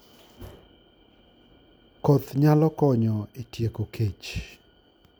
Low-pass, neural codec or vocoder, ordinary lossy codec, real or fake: none; none; none; real